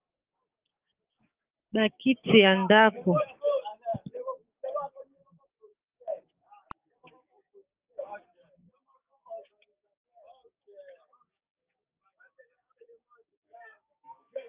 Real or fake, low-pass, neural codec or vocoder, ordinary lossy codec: fake; 3.6 kHz; codec, 16 kHz, 6 kbps, DAC; Opus, 24 kbps